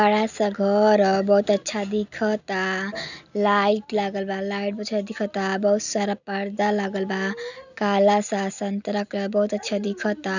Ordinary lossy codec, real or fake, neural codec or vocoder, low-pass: none; real; none; 7.2 kHz